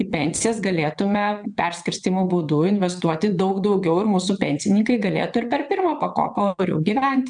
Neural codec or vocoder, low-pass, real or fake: vocoder, 22.05 kHz, 80 mel bands, WaveNeXt; 9.9 kHz; fake